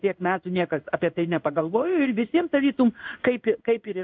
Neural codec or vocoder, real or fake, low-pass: codec, 16 kHz in and 24 kHz out, 1 kbps, XY-Tokenizer; fake; 7.2 kHz